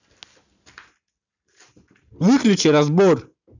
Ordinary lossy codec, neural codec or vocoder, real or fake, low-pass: none; none; real; 7.2 kHz